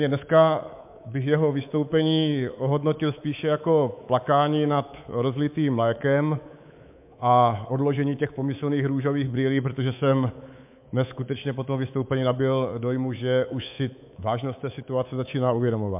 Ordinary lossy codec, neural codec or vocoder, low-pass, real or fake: MP3, 32 kbps; codec, 24 kHz, 3.1 kbps, DualCodec; 3.6 kHz; fake